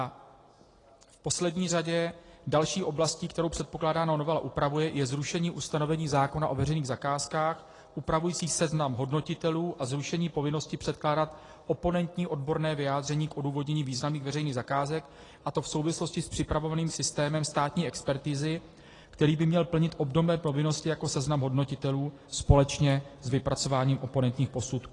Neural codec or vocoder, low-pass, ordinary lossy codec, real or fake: none; 10.8 kHz; AAC, 32 kbps; real